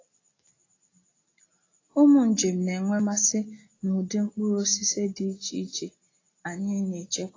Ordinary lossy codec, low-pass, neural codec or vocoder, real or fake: AAC, 32 kbps; 7.2 kHz; none; real